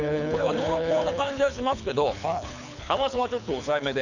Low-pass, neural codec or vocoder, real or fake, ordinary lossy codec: 7.2 kHz; codec, 24 kHz, 6 kbps, HILCodec; fake; none